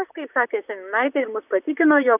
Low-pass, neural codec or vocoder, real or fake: 3.6 kHz; vocoder, 44.1 kHz, 128 mel bands, Pupu-Vocoder; fake